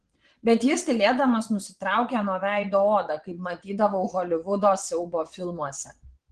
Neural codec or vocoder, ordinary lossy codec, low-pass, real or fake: vocoder, 22.05 kHz, 80 mel bands, Vocos; Opus, 16 kbps; 9.9 kHz; fake